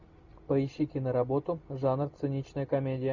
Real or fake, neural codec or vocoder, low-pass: real; none; 7.2 kHz